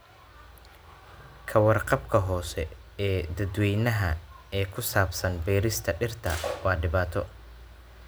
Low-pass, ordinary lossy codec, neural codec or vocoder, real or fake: none; none; none; real